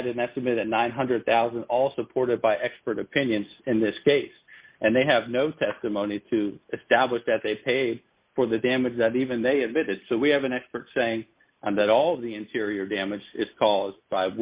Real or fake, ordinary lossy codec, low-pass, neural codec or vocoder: real; Opus, 64 kbps; 3.6 kHz; none